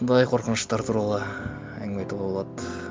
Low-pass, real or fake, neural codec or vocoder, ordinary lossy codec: none; real; none; none